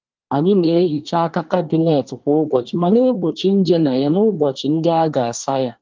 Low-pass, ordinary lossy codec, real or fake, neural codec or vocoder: 7.2 kHz; Opus, 32 kbps; fake; codec, 24 kHz, 1 kbps, SNAC